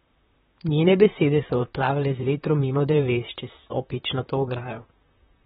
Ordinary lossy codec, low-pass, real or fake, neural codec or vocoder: AAC, 16 kbps; 19.8 kHz; fake; vocoder, 44.1 kHz, 128 mel bands, Pupu-Vocoder